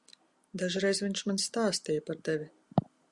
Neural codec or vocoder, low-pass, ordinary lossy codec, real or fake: none; 10.8 kHz; Opus, 64 kbps; real